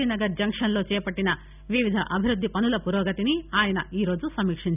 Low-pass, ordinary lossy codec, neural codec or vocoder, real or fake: 3.6 kHz; none; none; real